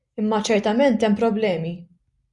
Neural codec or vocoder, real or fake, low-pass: none; real; 10.8 kHz